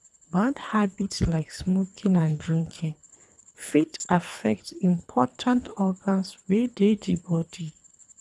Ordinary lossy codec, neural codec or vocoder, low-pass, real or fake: none; codec, 24 kHz, 3 kbps, HILCodec; none; fake